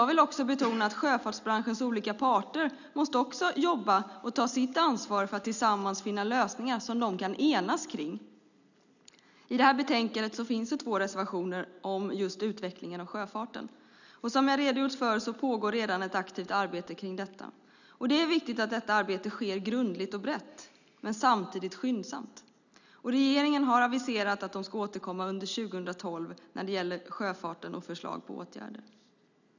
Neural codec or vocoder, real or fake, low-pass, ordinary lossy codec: none; real; 7.2 kHz; none